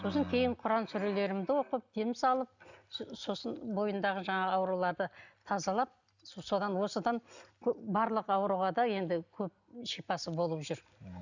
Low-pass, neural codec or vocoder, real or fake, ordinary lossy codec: 7.2 kHz; none; real; none